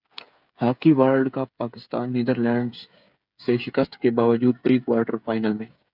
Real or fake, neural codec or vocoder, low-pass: fake; codec, 16 kHz, 8 kbps, FreqCodec, smaller model; 5.4 kHz